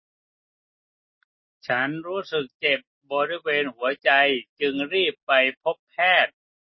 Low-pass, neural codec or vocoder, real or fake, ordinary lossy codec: 7.2 kHz; none; real; MP3, 24 kbps